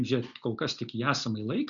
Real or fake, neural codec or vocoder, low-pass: real; none; 7.2 kHz